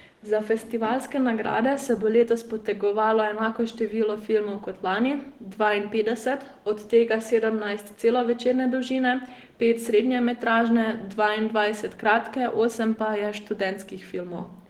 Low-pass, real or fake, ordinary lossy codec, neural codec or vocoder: 19.8 kHz; real; Opus, 16 kbps; none